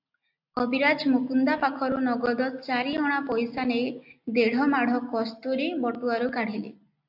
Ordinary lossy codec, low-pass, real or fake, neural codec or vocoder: AAC, 48 kbps; 5.4 kHz; real; none